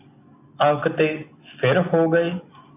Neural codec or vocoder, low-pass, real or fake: none; 3.6 kHz; real